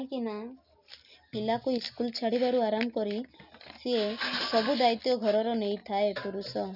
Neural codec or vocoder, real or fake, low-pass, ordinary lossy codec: none; real; 5.4 kHz; none